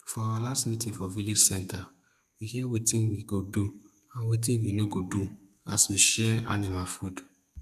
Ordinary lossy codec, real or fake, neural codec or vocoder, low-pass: none; fake; codec, 32 kHz, 1.9 kbps, SNAC; 14.4 kHz